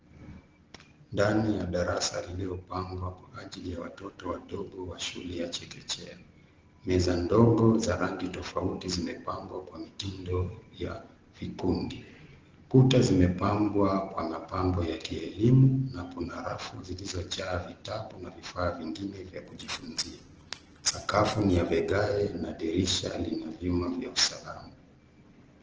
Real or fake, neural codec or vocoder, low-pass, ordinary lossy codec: real; none; 7.2 kHz; Opus, 16 kbps